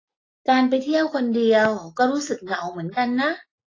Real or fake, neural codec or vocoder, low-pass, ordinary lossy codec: real; none; 7.2 kHz; AAC, 32 kbps